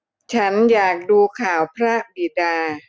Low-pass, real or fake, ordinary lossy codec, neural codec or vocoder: none; real; none; none